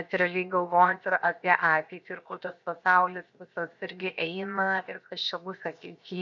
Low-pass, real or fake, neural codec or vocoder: 7.2 kHz; fake; codec, 16 kHz, 0.7 kbps, FocalCodec